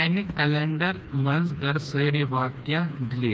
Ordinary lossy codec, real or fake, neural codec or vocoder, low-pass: none; fake; codec, 16 kHz, 2 kbps, FreqCodec, smaller model; none